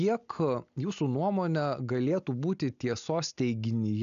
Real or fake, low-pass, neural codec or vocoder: real; 7.2 kHz; none